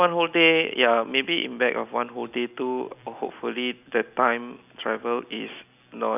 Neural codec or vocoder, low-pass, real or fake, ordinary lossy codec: none; 3.6 kHz; real; none